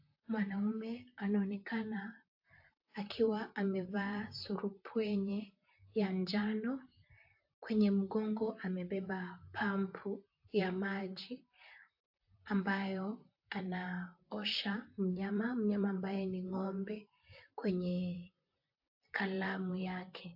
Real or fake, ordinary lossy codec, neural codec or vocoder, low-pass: fake; AAC, 48 kbps; vocoder, 44.1 kHz, 128 mel bands, Pupu-Vocoder; 5.4 kHz